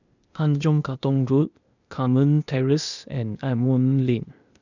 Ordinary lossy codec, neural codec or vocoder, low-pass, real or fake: none; codec, 16 kHz, 0.8 kbps, ZipCodec; 7.2 kHz; fake